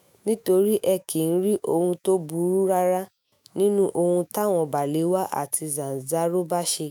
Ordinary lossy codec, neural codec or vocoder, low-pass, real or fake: none; autoencoder, 48 kHz, 128 numbers a frame, DAC-VAE, trained on Japanese speech; none; fake